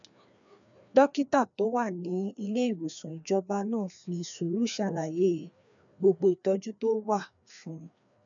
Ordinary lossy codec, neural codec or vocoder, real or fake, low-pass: MP3, 96 kbps; codec, 16 kHz, 2 kbps, FreqCodec, larger model; fake; 7.2 kHz